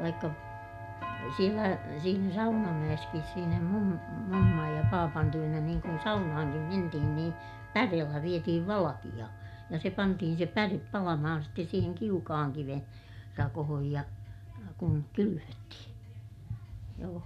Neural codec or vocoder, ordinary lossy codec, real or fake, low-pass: none; none; real; 14.4 kHz